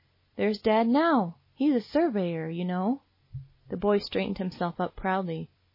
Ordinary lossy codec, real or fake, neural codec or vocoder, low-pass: MP3, 24 kbps; real; none; 5.4 kHz